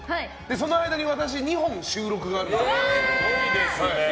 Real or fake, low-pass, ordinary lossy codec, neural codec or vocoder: real; none; none; none